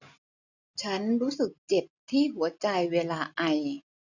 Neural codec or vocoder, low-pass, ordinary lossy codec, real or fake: none; 7.2 kHz; none; real